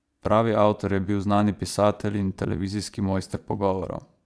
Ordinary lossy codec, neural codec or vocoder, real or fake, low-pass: none; vocoder, 22.05 kHz, 80 mel bands, Vocos; fake; none